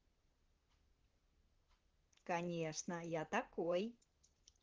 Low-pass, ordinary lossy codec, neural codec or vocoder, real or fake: 7.2 kHz; Opus, 16 kbps; vocoder, 44.1 kHz, 128 mel bands, Pupu-Vocoder; fake